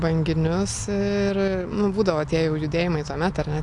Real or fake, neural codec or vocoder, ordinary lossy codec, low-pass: real; none; AAC, 64 kbps; 10.8 kHz